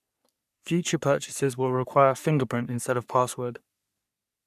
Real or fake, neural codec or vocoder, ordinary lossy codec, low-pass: fake; codec, 44.1 kHz, 3.4 kbps, Pupu-Codec; none; 14.4 kHz